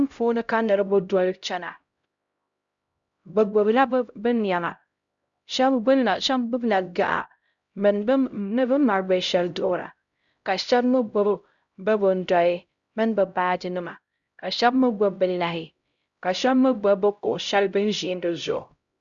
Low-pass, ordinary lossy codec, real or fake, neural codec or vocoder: 7.2 kHz; Opus, 64 kbps; fake; codec, 16 kHz, 0.5 kbps, X-Codec, HuBERT features, trained on LibriSpeech